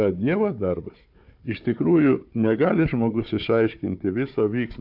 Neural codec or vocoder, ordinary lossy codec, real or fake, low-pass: codec, 16 kHz, 4 kbps, FunCodec, trained on Chinese and English, 50 frames a second; AAC, 48 kbps; fake; 5.4 kHz